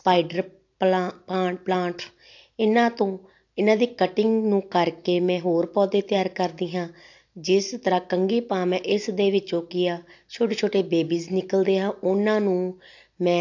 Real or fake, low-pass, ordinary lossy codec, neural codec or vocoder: real; 7.2 kHz; AAC, 48 kbps; none